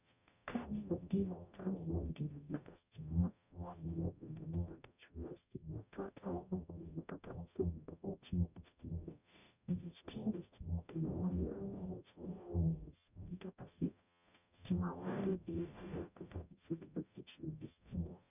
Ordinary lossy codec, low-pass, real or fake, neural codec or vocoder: none; 3.6 kHz; fake; codec, 44.1 kHz, 0.9 kbps, DAC